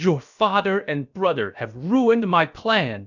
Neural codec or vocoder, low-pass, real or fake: codec, 16 kHz, about 1 kbps, DyCAST, with the encoder's durations; 7.2 kHz; fake